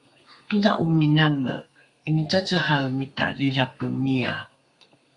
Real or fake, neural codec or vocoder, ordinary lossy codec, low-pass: fake; codec, 32 kHz, 1.9 kbps, SNAC; Opus, 64 kbps; 10.8 kHz